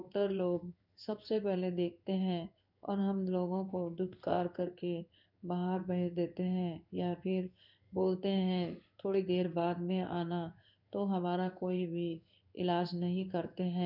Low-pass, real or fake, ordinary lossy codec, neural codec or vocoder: 5.4 kHz; fake; none; codec, 16 kHz in and 24 kHz out, 1 kbps, XY-Tokenizer